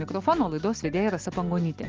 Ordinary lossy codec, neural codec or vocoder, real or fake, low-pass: Opus, 24 kbps; none; real; 7.2 kHz